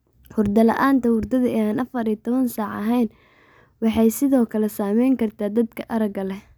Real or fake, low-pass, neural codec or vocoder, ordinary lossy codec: real; none; none; none